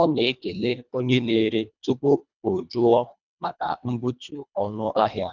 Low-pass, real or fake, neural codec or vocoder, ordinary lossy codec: 7.2 kHz; fake; codec, 24 kHz, 1.5 kbps, HILCodec; none